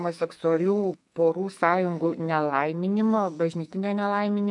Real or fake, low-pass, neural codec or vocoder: fake; 10.8 kHz; codec, 44.1 kHz, 2.6 kbps, SNAC